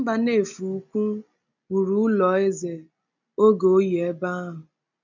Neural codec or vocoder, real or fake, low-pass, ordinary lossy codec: none; real; 7.2 kHz; none